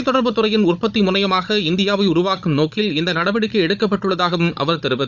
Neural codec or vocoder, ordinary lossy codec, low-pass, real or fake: codec, 16 kHz, 16 kbps, FunCodec, trained on Chinese and English, 50 frames a second; none; 7.2 kHz; fake